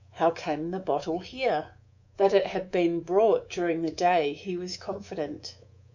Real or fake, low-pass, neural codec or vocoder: fake; 7.2 kHz; codec, 24 kHz, 3.1 kbps, DualCodec